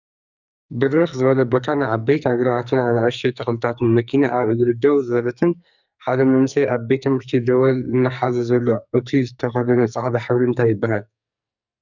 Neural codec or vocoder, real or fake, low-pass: codec, 44.1 kHz, 2.6 kbps, SNAC; fake; 7.2 kHz